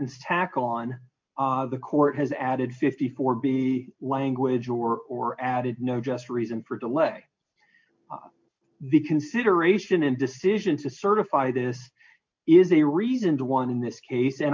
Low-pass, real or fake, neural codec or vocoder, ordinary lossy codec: 7.2 kHz; real; none; MP3, 48 kbps